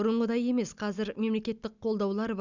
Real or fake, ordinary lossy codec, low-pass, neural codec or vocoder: real; none; 7.2 kHz; none